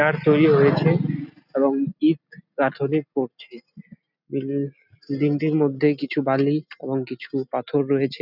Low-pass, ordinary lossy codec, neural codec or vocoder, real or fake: 5.4 kHz; none; none; real